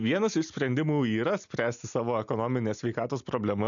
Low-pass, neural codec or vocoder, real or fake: 7.2 kHz; none; real